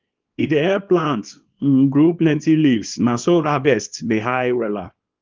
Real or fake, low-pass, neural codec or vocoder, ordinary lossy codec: fake; 7.2 kHz; codec, 24 kHz, 0.9 kbps, WavTokenizer, small release; Opus, 32 kbps